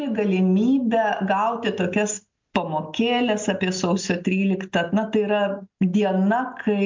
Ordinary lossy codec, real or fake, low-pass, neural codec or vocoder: MP3, 64 kbps; real; 7.2 kHz; none